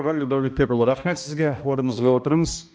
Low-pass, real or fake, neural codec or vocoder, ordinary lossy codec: none; fake; codec, 16 kHz, 1 kbps, X-Codec, HuBERT features, trained on balanced general audio; none